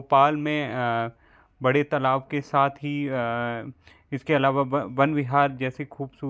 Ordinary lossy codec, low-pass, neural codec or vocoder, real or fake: none; none; none; real